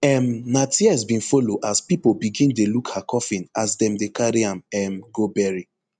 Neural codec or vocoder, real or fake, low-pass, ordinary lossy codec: none; real; 9.9 kHz; none